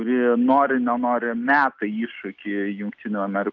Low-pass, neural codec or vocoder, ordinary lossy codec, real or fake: 7.2 kHz; none; Opus, 24 kbps; real